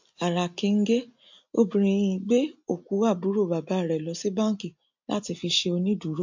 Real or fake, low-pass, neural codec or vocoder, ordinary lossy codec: real; 7.2 kHz; none; MP3, 48 kbps